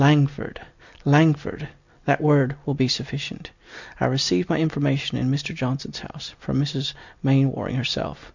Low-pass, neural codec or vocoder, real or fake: 7.2 kHz; none; real